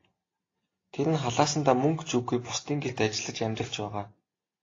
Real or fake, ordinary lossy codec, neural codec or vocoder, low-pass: real; AAC, 32 kbps; none; 7.2 kHz